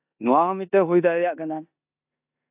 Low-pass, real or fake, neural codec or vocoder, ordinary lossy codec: 3.6 kHz; fake; codec, 16 kHz in and 24 kHz out, 0.9 kbps, LongCat-Audio-Codec, four codebook decoder; AAC, 32 kbps